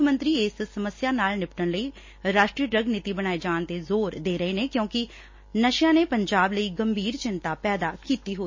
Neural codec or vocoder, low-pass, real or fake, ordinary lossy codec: none; 7.2 kHz; real; MP3, 32 kbps